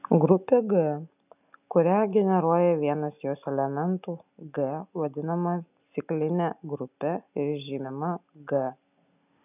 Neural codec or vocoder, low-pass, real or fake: none; 3.6 kHz; real